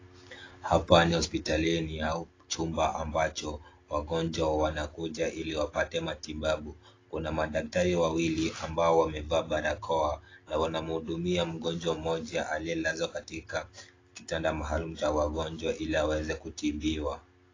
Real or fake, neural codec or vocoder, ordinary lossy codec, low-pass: real; none; AAC, 32 kbps; 7.2 kHz